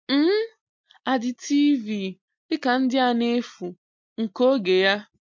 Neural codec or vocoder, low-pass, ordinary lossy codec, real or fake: none; 7.2 kHz; MP3, 48 kbps; real